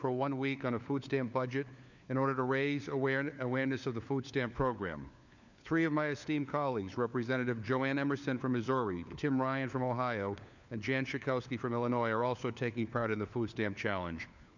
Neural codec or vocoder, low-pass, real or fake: codec, 16 kHz, 4 kbps, FunCodec, trained on LibriTTS, 50 frames a second; 7.2 kHz; fake